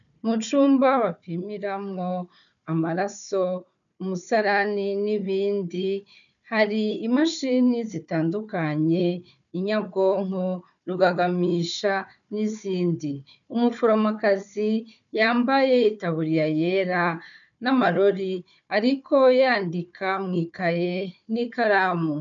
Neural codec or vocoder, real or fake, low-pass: codec, 16 kHz, 4 kbps, FunCodec, trained on Chinese and English, 50 frames a second; fake; 7.2 kHz